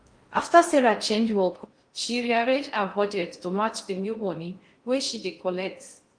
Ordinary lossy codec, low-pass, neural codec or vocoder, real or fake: Opus, 32 kbps; 9.9 kHz; codec, 16 kHz in and 24 kHz out, 0.6 kbps, FocalCodec, streaming, 4096 codes; fake